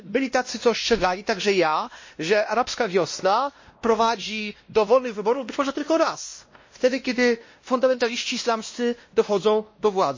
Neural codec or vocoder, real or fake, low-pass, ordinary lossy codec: codec, 16 kHz, 1 kbps, X-Codec, WavLM features, trained on Multilingual LibriSpeech; fake; 7.2 kHz; MP3, 32 kbps